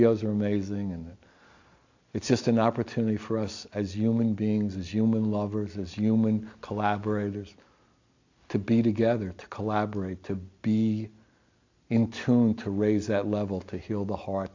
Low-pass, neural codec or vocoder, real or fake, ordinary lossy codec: 7.2 kHz; none; real; AAC, 48 kbps